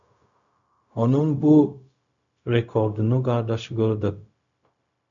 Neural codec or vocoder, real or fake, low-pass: codec, 16 kHz, 0.4 kbps, LongCat-Audio-Codec; fake; 7.2 kHz